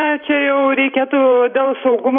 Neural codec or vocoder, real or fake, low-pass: vocoder, 44.1 kHz, 128 mel bands every 512 samples, BigVGAN v2; fake; 5.4 kHz